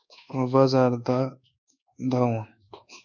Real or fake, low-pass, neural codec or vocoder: fake; 7.2 kHz; codec, 24 kHz, 1.2 kbps, DualCodec